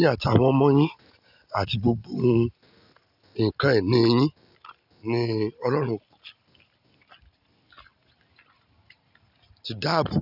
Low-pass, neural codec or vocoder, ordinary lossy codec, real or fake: 5.4 kHz; none; none; real